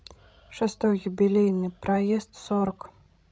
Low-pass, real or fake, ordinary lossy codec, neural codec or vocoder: none; fake; none; codec, 16 kHz, 16 kbps, FreqCodec, larger model